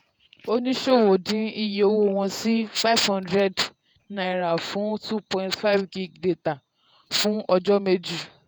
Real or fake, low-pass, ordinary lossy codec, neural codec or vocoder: fake; none; none; vocoder, 48 kHz, 128 mel bands, Vocos